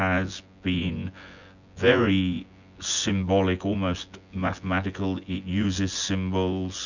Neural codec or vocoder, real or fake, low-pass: vocoder, 24 kHz, 100 mel bands, Vocos; fake; 7.2 kHz